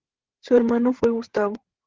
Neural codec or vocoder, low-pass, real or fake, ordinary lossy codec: codec, 16 kHz, 16 kbps, FreqCodec, larger model; 7.2 kHz; fake; Opus, 16 kbps